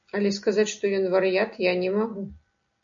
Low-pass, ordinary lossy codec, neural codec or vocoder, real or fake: 7.2 kHz; AAC, 64 kbps; none; real